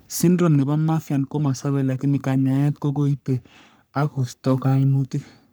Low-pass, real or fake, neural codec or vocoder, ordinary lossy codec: none; fake; codec, 44.1 kHz, 3.4 kbps, Pupu-Codec; none